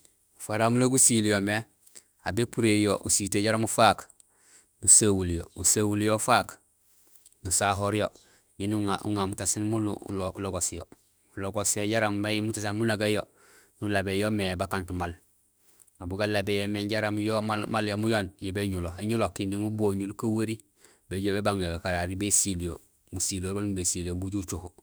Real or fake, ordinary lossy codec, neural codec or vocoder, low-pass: fake; none; autoencoder, 48 kHz, 32 numbers a frame, DAC-VAE, trained on Japanese speech; none